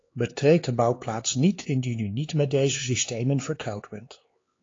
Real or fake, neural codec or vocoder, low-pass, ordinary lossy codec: fake; codec, 16 kHz, 4 kbps, X-Codec, HuBERT features, trained on LibriSpeech; 7.2 kHz; AAC, 32 kbps